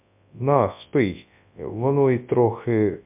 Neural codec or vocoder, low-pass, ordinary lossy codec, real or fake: codec, 24 kHz, 0.9 kbps, WavTokenizer, large speech release; 3.6 kHz; MP3, 32 kbps; fake